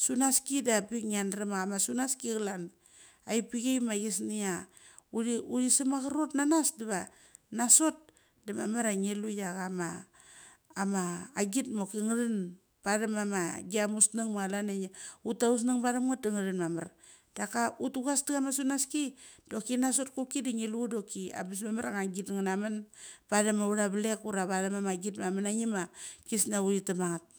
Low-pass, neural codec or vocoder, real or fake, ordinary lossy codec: none; autoencoder, 48 kHz, 128 numbers a frame, DAC-VAE, trained on Japanese speech; fake; none